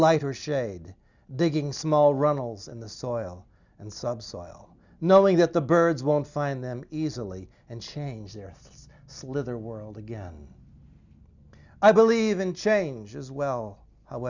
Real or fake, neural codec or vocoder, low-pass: real; none; 7.2 kHz